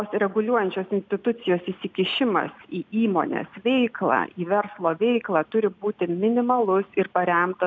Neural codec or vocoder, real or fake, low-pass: none; real; 7.2 kHz